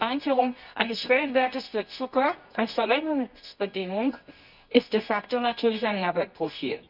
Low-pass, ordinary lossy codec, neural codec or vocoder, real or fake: 5.4 kHz; none; codec, 24 kHz, 0.9 kbps, WavTokenizer, medium music audio release; fake